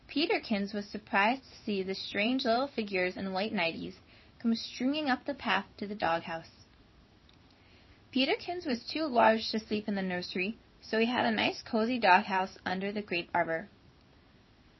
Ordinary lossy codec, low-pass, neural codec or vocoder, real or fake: MP3, 24 kbps; 7.2 kHz; codec, 16 kHz in and 24 kHz out, 1 kbps, XY-Tokenizer; fake